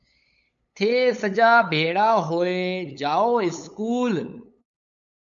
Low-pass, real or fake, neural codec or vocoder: 7.2 kHz; fake; codec, 16 kHz, 8 kbps, FunCodec, trained on LibriTTS, 25 frames a second